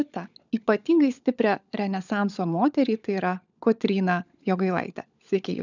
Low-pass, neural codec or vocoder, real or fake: 7.2 kHz; codec, 16 kHz, 16 kbps, FunCodec, trained on LibriTTS, 50 frames a second; fake